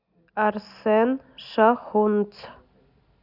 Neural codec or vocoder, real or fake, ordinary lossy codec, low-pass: none; real; none; 5.4 kHz